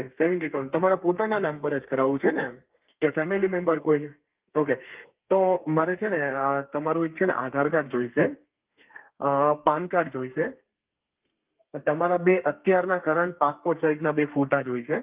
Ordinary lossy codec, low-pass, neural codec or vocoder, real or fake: Opus, 32 kbps; 3.6 kHz; codec, 32 kHz, 1.9 kbps, SNAC; fake